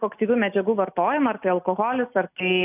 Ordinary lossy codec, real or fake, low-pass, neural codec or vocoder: AAC, 32 kbps; real; 3.6 kHz; none